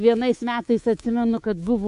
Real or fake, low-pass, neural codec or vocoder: fake; 10.8 kHz; codec, 24 kHz, 3.1 kbps, DualCodec